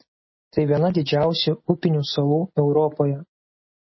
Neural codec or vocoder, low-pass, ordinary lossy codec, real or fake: none; 7.2 kHz; MP3, 24 kbps; real